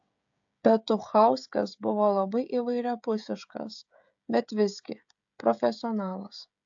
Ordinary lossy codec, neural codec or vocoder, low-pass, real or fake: AAC, 64 kbps; codec, 16 kHz, 16 kbps, FreqCodec, smaller model; 7.2 kHz; fake